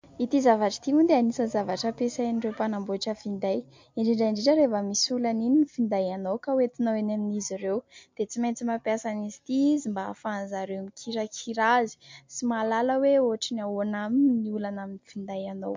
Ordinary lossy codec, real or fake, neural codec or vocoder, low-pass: MP3, 48 kbps; real; none; 7.2 kHz